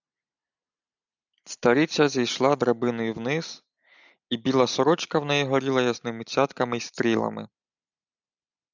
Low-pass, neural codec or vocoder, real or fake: 7.2 kHz; none; real